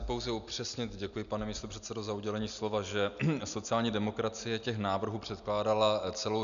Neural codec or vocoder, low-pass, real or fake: none; 7.2 kHz; real